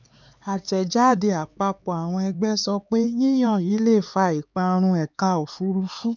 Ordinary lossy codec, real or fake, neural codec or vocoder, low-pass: none; fake; codec, 16 kHz, 4 kbps, X-Codec, HuBERT features, trained on LibriSpeech; 7.2 kHz